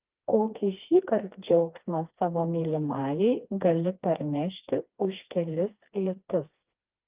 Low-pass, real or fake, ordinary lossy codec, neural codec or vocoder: 3.6 kHz; fake; Opus, 32 kbps; codec, 16 kHz, 2 kbps, FreqCodec, smaller model